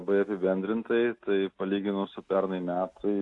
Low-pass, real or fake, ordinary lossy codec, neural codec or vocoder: 10.8 kHz; real; AAC, 48 kbps; none